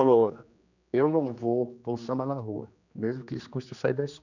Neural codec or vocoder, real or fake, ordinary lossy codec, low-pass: codec, 16 kHz, 2 kbps, X-Codec, HuBERT features, trained on general audio; fake; none; 7.2 kHz